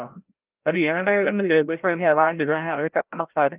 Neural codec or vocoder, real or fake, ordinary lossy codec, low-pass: codec, 16 kHz, 0.5 kbps, FreqCodec, larger model; fake; Opus, 24 kbps; 3.6 kHz